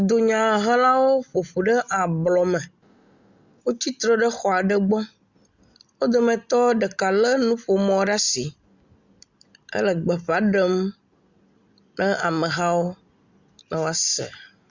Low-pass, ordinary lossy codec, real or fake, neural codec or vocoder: 7.2 kHz; Opus, 64 kbps; real; none